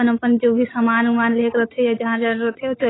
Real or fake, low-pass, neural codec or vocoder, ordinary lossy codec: real; 7.2 kHz; none; AAC, 16 kbps